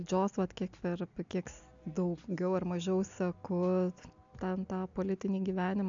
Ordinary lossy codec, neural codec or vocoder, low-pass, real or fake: AAC, 64 kbps; none; 7.2 kHz; real